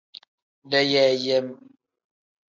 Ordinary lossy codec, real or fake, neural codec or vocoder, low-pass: MP3, 48 kbps; real; none; 7.2 kHz